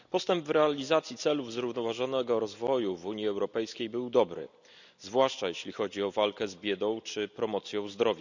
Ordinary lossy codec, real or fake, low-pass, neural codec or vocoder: none; real; 7.2 kHz; none